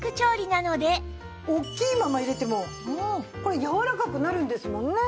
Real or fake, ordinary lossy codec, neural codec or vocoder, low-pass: real; none; none; none